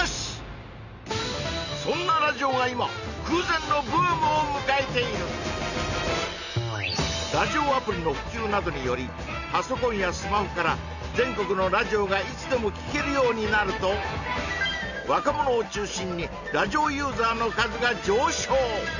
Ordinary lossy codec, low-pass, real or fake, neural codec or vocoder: none; 7.2 kHz; real; none